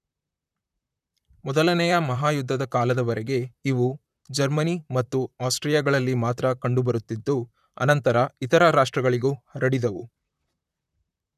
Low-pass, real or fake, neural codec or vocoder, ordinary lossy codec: 14.4 kHz; fake; vocoder, 44.1 kHz, 128 mel bands, Pupu-Vocoder; none